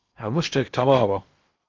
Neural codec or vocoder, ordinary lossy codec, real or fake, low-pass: codec, 16 kHz in and 24 kHz out, 0.8 kbps, FocalCodec, streaming, 65536 codes; Opus, 32 kbps; fake; 7.2 kHz